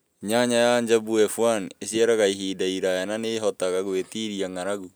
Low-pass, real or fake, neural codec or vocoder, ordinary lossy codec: none; real; none; none